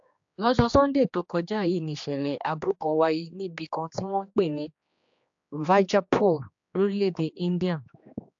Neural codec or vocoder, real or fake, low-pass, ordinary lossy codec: codec, 16 kHz, 2 kbps, X-Codec, HuBERT features, trained on general audio; fake; 7.2 kHz; AAC, 64 kbps